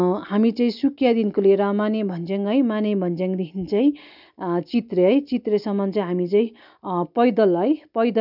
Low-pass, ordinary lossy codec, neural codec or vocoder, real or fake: 5.4 kHz; none; none; real